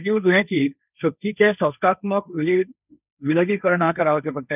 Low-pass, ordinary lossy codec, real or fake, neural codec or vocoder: 3.6 kHz; none; fake; codec, 16 kHz, 1.1 kbps, Voila-Tokenizer